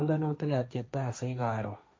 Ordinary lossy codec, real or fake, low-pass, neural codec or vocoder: none; fake; none; codec, 16 kHz, 1.1 kbps, Voila-Tokenizer